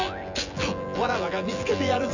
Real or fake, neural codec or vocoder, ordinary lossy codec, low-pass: fake; vocoder, 24 kHz, 100 mel bands, Vocos; none; 7.2 kHz